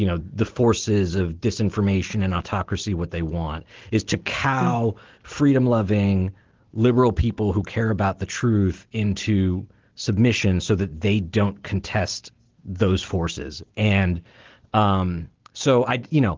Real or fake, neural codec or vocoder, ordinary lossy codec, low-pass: real; none; Opus, 16 kbps; 7.2 kHz